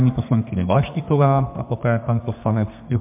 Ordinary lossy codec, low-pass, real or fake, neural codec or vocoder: MP3, 32 kbps; 3.6 kHz; fake; codec, 32 kHz, 1.9 kbps, SNAC